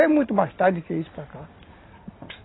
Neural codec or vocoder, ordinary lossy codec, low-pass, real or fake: none; AAC, 16 kbps; 7.2 kHz; real